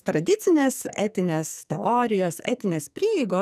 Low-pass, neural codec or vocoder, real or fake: 14.4 kHz; codec, 44.1 kHz, 2.6 kbps, SNAC; fake